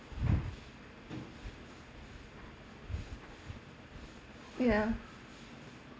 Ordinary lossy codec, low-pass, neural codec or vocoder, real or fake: none; none; none; real